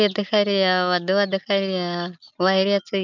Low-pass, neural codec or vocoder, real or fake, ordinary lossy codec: 7.2 kHz; none; real; none